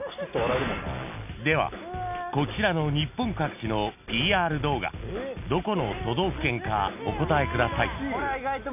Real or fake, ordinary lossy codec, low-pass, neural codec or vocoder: real; none; 3.6 kHz; none